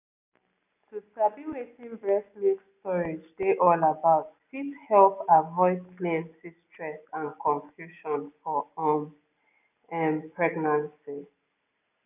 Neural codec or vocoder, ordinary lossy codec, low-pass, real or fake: none; none; 3.6 kHz; real